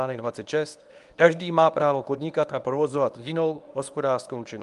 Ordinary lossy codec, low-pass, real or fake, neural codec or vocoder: Opus, 32 kbps; 10.8 kHz; fake; codec, 24 kHz, 0.9 kbps, WavTokenizer, medium speech release version 1